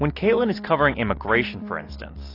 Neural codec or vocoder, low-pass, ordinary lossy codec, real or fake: none; 5.4 kHz; AAC, 32 kbps; real